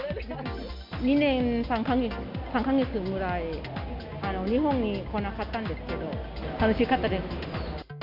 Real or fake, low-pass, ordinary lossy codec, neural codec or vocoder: real; 5.4 kHz; none; none